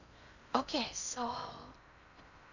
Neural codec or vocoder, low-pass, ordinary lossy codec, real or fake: codec, 16 kHz in and 24 kHz out, 0.6 kbps, FocalCodec, streaming, 4096 codes; 7.2 kHz; none; fake